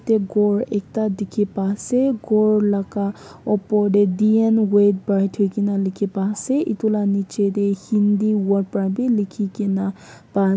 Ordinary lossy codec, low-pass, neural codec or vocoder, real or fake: none; none; none; real